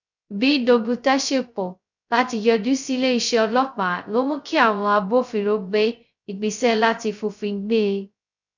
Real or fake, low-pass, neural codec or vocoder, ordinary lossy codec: fake; 7.2 kHz; codec, 16 kHz, 0.2 kbps, FocalCodec; none